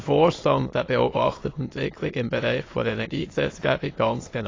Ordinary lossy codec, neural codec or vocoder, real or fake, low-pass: AAC, 32 kbps; autoencoder, 22.05 kHz, a latent of 192 numbers a frame, VITS, trained on many speakers; fake; 7.2 kHz